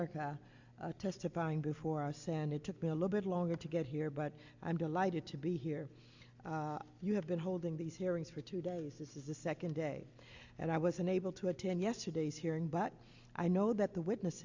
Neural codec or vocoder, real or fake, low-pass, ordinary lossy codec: none; real; 7.2 kHz; Opus, 64 kbps